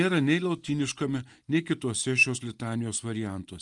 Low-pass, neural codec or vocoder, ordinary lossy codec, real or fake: 10.8 kHz; none; Opus, 32 kbps; real